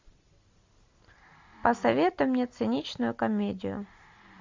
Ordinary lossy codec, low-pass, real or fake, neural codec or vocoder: MP3, 64 kbps; 7.2 kHz; real; none